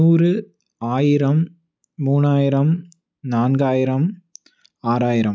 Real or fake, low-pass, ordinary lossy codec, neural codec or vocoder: real; none; none; none